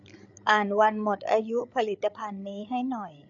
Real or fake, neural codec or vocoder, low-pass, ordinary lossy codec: fake; codec, 16 kHz, 8 kbps, FreqCodec, larger model; 7.2 kHz; none